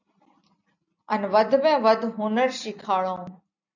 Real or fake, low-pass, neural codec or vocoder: real; 7.2 kHz; none